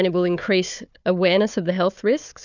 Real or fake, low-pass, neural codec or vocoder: fake; 7.2 kHz; codec, 16 kHz, 4 kbps, FunCodec, trained on Chinese and English, 50 frames a second